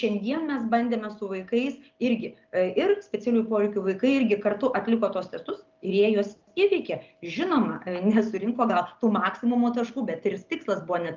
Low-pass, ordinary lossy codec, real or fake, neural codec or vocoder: 7.2 kHz; Opus, 32 kbps; real; none